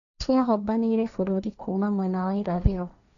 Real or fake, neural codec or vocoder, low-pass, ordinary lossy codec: fake; codec, 16 kHz, 1.1 kbps, Voila-Tokenizer; 7.2 kHz; none